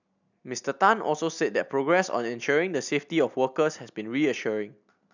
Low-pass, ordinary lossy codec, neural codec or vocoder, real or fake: 7.2 kHz; none; none; real